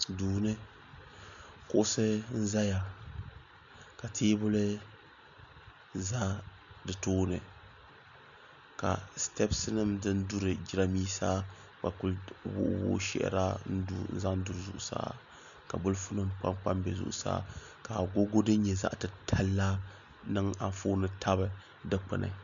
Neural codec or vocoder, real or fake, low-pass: none; real; 7.2 kHz